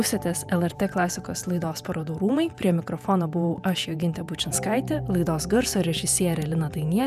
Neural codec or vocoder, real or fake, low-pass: vocoder, 48 kHz, 128 mel bands, Vocos; fake; 14.4 kHz